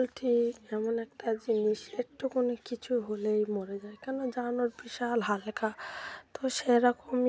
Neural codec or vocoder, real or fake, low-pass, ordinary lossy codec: none; real; none; none